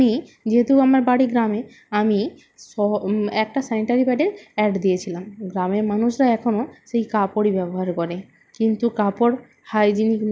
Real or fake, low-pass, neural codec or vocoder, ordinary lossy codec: real; none; none; none